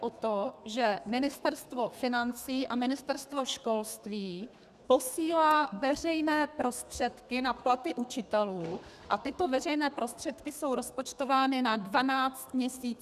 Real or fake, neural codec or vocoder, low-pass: fake; codec, 32 kHz, 1.9 kbps, SNAC; 14.4 kHz